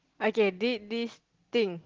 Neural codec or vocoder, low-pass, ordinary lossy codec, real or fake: none; 7.2 kHz; Opus, 16 kbps; real